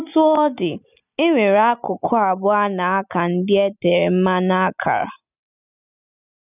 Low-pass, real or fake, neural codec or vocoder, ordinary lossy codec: 3.6 kHz; real; none; none